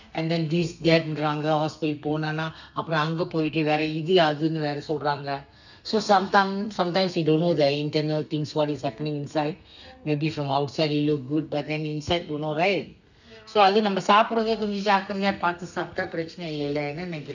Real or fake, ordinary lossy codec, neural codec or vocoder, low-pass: fake; none; codec, 32 kHz, 1.9 kbps, SNAC; 7.2 kHz